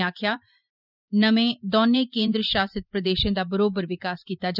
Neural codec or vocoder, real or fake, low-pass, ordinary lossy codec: vocoder, 44.1 kHz, 128 mel bands every 512 samples, BigVGAN v2; fake; 5.4 kHz; none